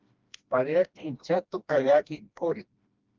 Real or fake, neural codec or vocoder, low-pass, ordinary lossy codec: fake; codec, 16 kHz, 1 kbps, FreqCodec, smaller model; 7.2 kHz; Opus, 24 kbps